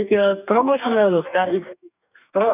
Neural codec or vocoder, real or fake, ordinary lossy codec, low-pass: codec, 44.1 kHz, 2.6 kbps, DAC; fake; none; 3.6 kHz